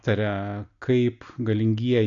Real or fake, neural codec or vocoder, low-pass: real; none; 7.2 kHz